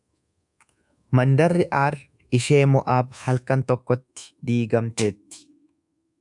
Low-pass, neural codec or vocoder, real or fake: 10.8 kHz; codec, 24 kHz, 1.2 kbps, DualCodec; fake